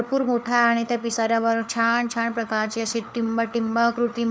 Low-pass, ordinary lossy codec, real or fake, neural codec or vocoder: none; none; fake; codec, 16 kHz, 4 kbps, FunCodec, trained on LibriTTS, 50 frames a second